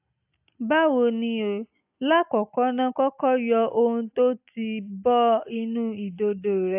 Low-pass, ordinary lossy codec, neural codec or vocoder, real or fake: 3.6 kHz; AAC, 32 kbps; none; real